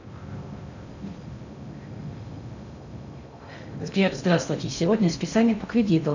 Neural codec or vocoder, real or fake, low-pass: codec, 16 kHz in and 24 kHz out, 0.6 kbps, FocalCodec, streaming, 2048 codes; fake; 7.2 kHz